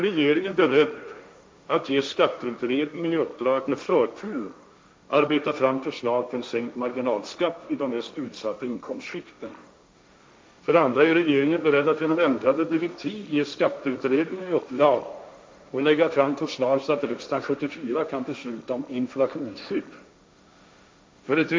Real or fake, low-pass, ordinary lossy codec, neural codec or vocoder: fake; none; none; codec, 16 kHz, 1.1 kbps, Voila-Tokenizer